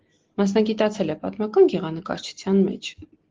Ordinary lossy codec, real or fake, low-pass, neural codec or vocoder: Opus, 16 kbps; real; 7.2 kHz; none